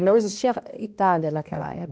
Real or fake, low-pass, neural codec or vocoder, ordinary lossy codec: fake; none; codec, 16 kHz, 1 kbps, X-Codec, HuBERT features, trained on balanced general audio; none